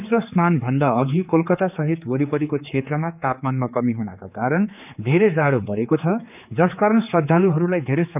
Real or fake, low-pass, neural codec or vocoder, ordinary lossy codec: fake; 3.6 kHz; codec, 16 kHz, 4 kbps, X-Codec, HuBERT features, trained on general audio; none